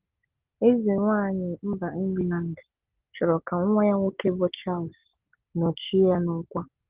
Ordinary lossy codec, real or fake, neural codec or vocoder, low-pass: Opus, 16 kbps; real; none; 3.6 kHz